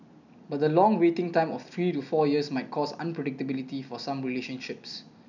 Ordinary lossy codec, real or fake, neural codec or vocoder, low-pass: none; real; none; 7.2 kHz